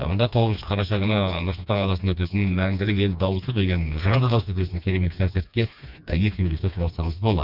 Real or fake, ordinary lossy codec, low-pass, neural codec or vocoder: fake; none; 5.4 kHz; codec, 16 kHz, 2 kbps, FreqCodec, smaller model